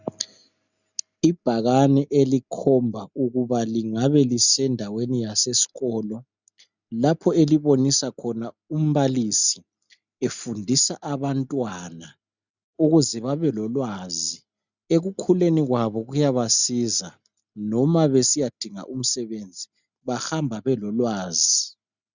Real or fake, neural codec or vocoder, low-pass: real; none; 7.2 kHz